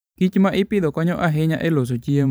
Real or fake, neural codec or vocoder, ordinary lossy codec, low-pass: fake; vocoder, 44.1 kHz, 128 mel bands every 512 samples, BigVGAN v2; none; none